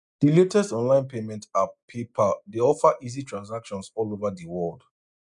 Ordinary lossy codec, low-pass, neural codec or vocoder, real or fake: none; 10.8 kHz; none; real